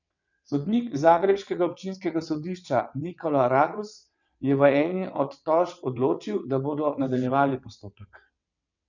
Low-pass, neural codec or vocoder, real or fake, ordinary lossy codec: 7.2 kHz; vocoder, 22.05 kHz, 80 mel bands, WaveNeXt; fake; none